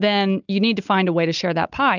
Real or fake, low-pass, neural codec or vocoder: real; 7.2 kHz; none